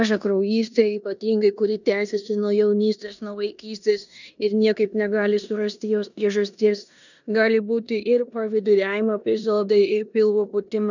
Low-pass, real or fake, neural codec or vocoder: 7.2 kHz; fake; codec, 16 kHz in and 24 kHz out, 0.9 kbps, LongCat-Audio-Codec, four codebook decoder